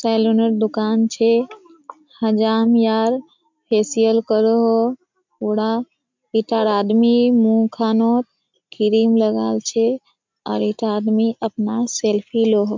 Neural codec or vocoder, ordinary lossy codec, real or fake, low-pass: none; MP3, 64 kbps; real; 7.2 kHz